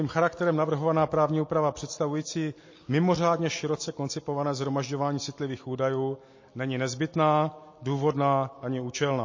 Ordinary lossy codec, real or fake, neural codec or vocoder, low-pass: MP3, 32 kbps; real; none; 7.2 kHz